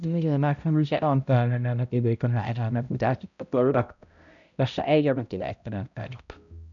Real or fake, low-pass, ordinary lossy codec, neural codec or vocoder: fake; 7.2 kHz; none; codec, 16 kHz, 0.5 kbps, X-Codec, HuBERT features, trained on balanced general audio